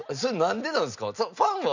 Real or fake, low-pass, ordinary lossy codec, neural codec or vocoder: real; 7.2 kHz; none; none